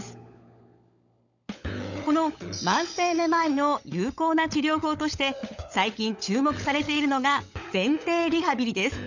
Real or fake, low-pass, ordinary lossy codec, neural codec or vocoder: fake; 7.2 kHz; none; codec, 16 kHz, 16 kbps, FunCodec, trained on LibriTTS, 50 frames a second